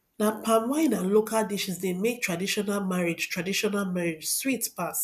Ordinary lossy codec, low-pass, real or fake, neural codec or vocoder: none; 14.4 kHz; real; none